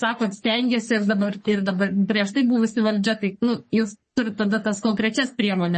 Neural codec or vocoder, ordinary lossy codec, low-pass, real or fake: codec, 44.1 kHz, 3.4 kbps, Pupu-Codec; MP3, 32 kbps; 10.8 kHz; fake